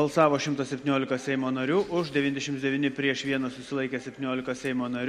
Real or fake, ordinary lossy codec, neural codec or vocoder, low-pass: real; MP3, 64 kbps; none; 19.8 kHz